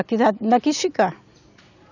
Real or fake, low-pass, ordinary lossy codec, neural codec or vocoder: real; 7.2 kHz; none; none